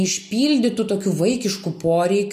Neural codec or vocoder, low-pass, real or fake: none; 14.4 kHz; real